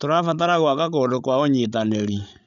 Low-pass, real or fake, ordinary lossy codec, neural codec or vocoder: 7.2 kHz; fake; none; codec, 16 kHz, 16 kbps, FreqCodec, larger model